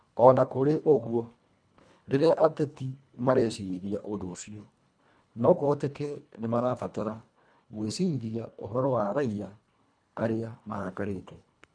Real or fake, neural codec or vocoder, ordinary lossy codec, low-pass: fake; codec, 24 kHz, 1.5 kbps, HILCodec; none; 9.9 kHz